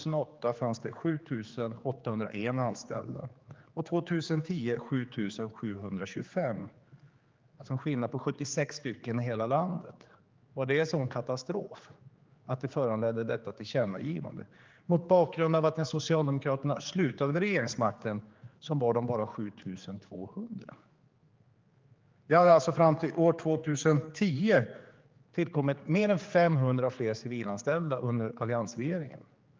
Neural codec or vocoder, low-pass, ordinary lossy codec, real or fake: codec, 16 kHz, 4 kbps, X-Codec, HuBERT features, trained on general audio; 7.2 kHz; Opus, 32 kbps; fake